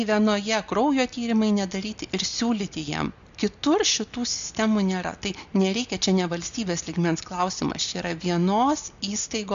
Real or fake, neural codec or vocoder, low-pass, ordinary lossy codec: real; none; 7.2 kHz; MP3, 64 kbps